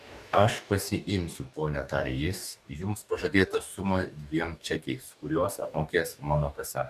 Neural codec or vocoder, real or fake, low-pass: codec, 44.1 kHz, 2.6 kbps, DAC; fake; 14.4 kHz